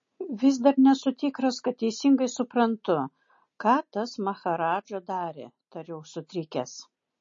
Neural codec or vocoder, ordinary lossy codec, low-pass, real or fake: none; MP3, 32 kbps; 7.2 kHz; real